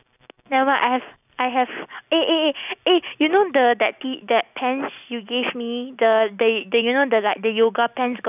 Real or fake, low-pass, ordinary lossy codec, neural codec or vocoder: real; 3.6 kHz; none; none